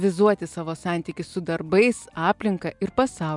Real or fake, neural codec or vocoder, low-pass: real; none; 10.8 kHz